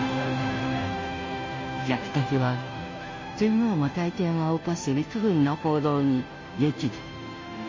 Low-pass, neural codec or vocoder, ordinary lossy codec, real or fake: 7.2 kHz; codec, 16 kHz, 0.5 kbps, FunCodec, trained on Chinese and English, 25 frames a second; MP3, 32 kbps; fake